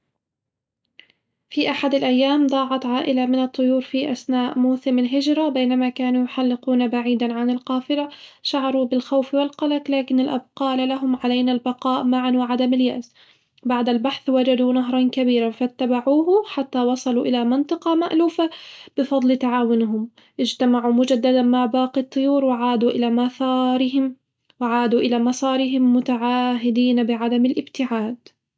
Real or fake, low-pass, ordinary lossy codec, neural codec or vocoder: real; none; none; none